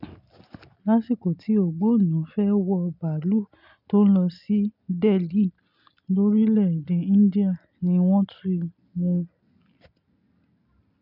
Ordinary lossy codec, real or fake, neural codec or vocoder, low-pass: none; real; none; 5.4 kHz